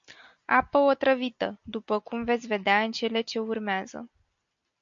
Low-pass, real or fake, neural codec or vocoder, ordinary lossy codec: 7.2 kHz; real; none; MP3, 64 kbps